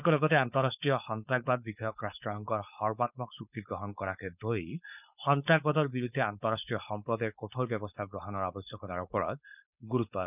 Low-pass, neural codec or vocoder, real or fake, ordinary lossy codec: 3.6 kHz; codec, 16 kHz, 4.8 kbps, FACodec; fake; none